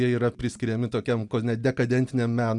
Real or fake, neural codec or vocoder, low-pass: real; none; 10.8 kHz